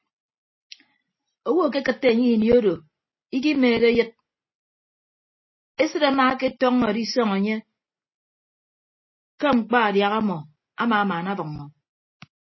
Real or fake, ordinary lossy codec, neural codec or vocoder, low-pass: real; MP3, 24 kbps; none; 7.2 kHz